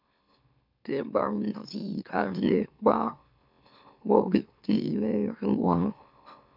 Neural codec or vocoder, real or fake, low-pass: autoencoder, 44.1 kHz, a latent of 192 numbers a frame, MeloTTS; fake; 5.4 kHz